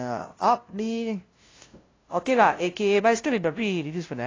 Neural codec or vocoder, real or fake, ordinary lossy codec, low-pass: codec, 16 kHz, 0.5 kbps, FunCodec, trained on LibriTTS, 25 frames a second; fake; AAC, 32 kbps; 7.2 kHz